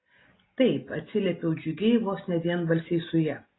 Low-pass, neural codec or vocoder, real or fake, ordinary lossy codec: 7.2 kHz; none; real; AAC, 16 kbps